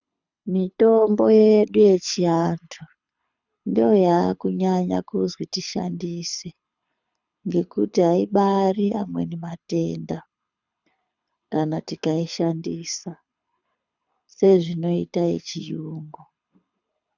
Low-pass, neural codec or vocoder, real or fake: 7.2 kHz; codec, 24 kHz, 6 kbps, HILCodec; fake